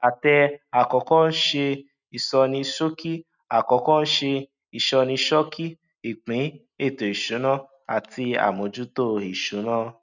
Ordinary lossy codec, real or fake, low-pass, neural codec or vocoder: none; real; 7.2 kHz; none